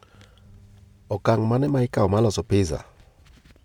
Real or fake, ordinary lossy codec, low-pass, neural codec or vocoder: real; MP3, 96 kbps; 19.8 kHz; none